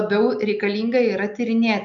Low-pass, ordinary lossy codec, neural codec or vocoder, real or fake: 7.2 kHz; MP3, 96 kbps; none; real